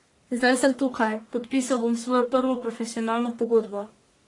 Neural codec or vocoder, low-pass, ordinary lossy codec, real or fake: codec, 44.1 kHz, 1.7 kbps, Pupu-Codec; 10.8 kHz; AAC, 48 kbps; fake